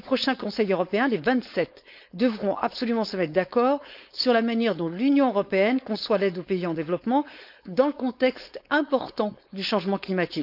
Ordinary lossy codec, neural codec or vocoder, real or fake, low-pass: none; codec, 16 kHz, 4.8 kbps, FACodec; fake; 5.4 kHz